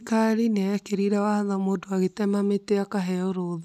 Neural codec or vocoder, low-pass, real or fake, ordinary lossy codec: none; 14.4 kHz; real; none